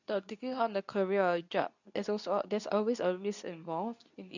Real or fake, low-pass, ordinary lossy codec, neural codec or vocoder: fake; 7.2 kHz; none; codec, 24 kHz, 0.9 kbps, WavTokenizer, medium speech release version 2